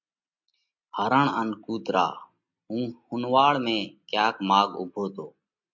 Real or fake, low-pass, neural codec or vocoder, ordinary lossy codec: real; 7.2 kHz; none; MP3, 48 kbps